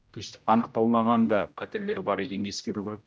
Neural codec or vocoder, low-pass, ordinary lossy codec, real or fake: codec, 16 kHz, 0.5 kbps, X-Codec, HuBERT features, trained on general audio; none; none; fake